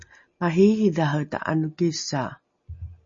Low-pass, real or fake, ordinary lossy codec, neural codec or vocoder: 7.2 kHz; real; MP3, 32 kbps; none